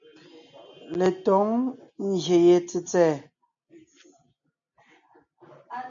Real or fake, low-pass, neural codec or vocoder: real; 7.2 kHz; none